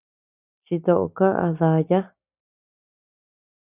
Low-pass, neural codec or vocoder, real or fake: 3.6 kHz; none; real